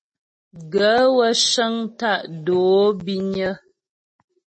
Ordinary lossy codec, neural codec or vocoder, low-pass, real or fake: MP3, 32 kbps; none; 9.9 kHz; real